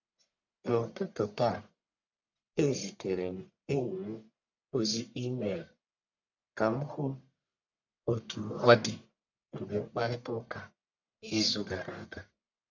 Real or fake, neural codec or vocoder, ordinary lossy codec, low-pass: fake; codec, 44.1 kHz, 1.7 kbps, Pupu-Codec; none; 7.2 kHz